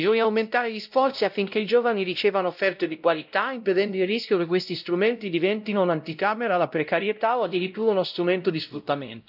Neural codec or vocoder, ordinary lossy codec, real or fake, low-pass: codec, 16 kHz, 0.5 kbps, X-Codec, WavLM features, trained on Multilingual LibriSpeech; none; fake; 5.4 kHz